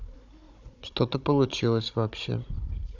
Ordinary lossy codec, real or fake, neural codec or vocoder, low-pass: none; fake; codec, 16 kHz, 16 kbps, FunCodec, trained on Chinese and English, 50 frames a second; 7.2 kHz